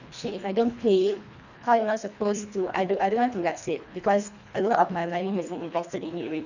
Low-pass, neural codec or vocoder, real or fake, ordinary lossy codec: 7.2 kHz; codec, 24 kHz, 1.5 kbps, HILCodec; fake; none